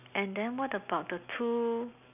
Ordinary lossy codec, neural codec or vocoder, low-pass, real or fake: none; none; 3.6 kHz; real